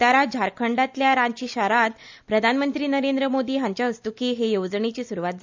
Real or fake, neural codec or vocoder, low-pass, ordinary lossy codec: real; none; 7.2 kHz; MP3, 64 kbps